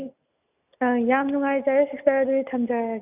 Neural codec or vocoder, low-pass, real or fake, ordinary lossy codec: none; 3.6 kHz; real; none